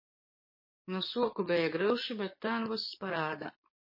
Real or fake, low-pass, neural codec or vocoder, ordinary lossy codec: fake; 5.4 kHz; codec, 44.1 kHz, 7.8 kbps, DAC; MP3, 24 kbps